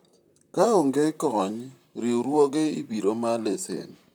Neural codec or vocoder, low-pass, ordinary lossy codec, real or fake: vocoder, 44.1 kHz, 128 mel bands, Pupu-Vocoder; none; none; fake